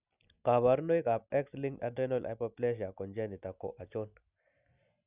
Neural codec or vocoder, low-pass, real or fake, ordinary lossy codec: none; 3.6 kHz; real; none